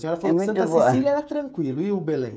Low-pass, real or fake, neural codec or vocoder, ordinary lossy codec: none; fake; codec, 16 kHz, 16 kbps, FreqCodec, smaller model; none